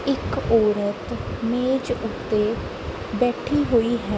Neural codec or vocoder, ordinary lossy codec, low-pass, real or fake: none; none; none; real